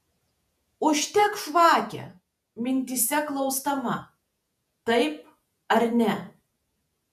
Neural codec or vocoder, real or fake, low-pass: vocoder, 48 kHz, 128 mel bands, Vocos; fake; 14.4 kHz